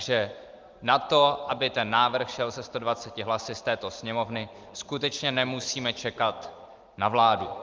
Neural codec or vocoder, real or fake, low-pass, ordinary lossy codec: none; real; 7.2 kHz; Opus, 32 kbps